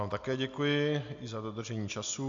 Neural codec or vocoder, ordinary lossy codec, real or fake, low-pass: none; AAC, 64 kbps; real; 7.2 kHz